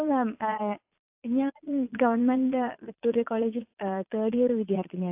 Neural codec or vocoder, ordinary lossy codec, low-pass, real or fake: vocoder, 22.05 kHz, 80 mel bands, Vocos; AAC, 32 kbps; 3.6 kHz; fake